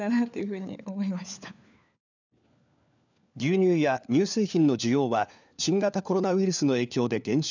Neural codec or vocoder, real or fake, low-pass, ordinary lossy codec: codec, 16 kHz, 4 kbps, FunCodec, trained on LibriTTS, 50 frames a second; fake; 7.2 kHz; none